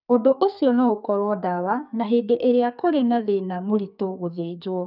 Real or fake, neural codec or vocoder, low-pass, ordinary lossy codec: fake; codec, 44.1 kHz, 2.6 kbps, SNAC; 5.4 kHz; none